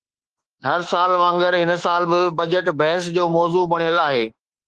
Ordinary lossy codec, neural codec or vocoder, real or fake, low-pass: Opus, 16 kbps; autoencoder, 48 kHz, 32 numbers a frame, DAC-VAE, trained on Japanese speech; fake; 10.8 kHz